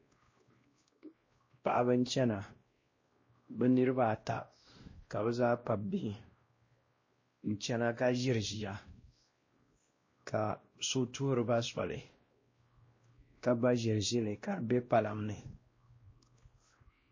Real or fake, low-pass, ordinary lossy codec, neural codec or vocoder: fake; 7.2 kHz; MP3, 32 kbps; codec, 16 kHz, 1 kbps, X-Codec, WavLM features, trained on Multilingual LibriSpeech